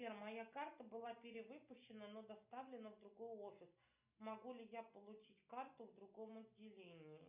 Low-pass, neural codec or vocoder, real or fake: 3.6 kHz; none; real